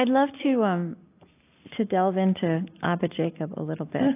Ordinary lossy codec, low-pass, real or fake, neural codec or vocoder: AAC, 24 kbps; 3.6 kHz; real; none